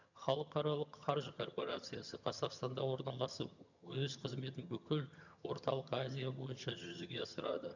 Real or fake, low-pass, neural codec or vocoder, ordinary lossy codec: fake; 7.2 kHz; vocoder, 22.05 kHz, 80 mel bands, HiFi-GAN; none